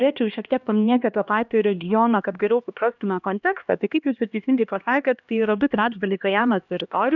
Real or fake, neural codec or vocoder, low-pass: fake; codec, 16 kHz, 1 kbps, X-Codec, HuBERT features, trained on LibriSpeech; 7.2 kHz